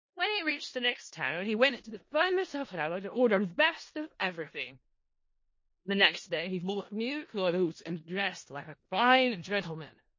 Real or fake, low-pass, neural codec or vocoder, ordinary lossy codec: fake; 7.2 kHz; codec, 16 kHz in and 24 kHz out, 0.4 kbps, LongCat-Audio-Codec, four codebook decoder; MP3, 32 kbps